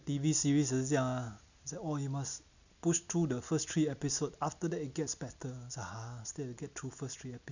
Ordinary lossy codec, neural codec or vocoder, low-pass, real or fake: none; none; 7.2 kHz; real